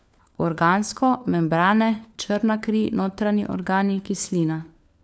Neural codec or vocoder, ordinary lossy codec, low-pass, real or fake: codec, 16 kHz, 4 kbps, FunCodec, trained on LibriTTS, 50 frames a second; none; none; fake